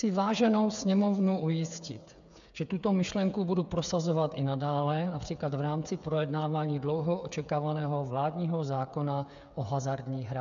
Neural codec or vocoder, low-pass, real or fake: codec, 16 kHz, 8 kbps, FreqCodec, smaller model; 7.2 kHz; fake